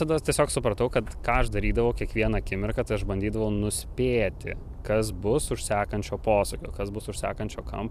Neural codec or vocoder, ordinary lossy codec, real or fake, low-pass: vocoder, 44.1 kHz, 128 mel bands every 256 samples, BigVGAN v2; AAC, 96 kbps; fake; 14.4 kHz